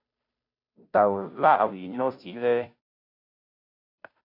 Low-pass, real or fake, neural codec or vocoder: 5.4 kHz; fake; codec, 16 kHz, 0.5 kbps, FunCodec, trained on Chinese and English, 25 frames a second